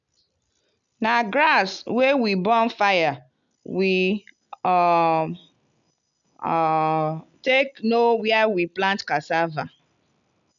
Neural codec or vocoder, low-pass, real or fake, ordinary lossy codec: none; 7.2 kHz; real; none